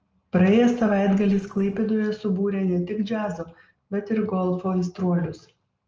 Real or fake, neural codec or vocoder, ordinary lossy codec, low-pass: real; none; Opus, 24 kbps; 7.2 kHz